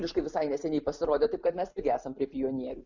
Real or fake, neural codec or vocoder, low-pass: real; none; 7.2 kHz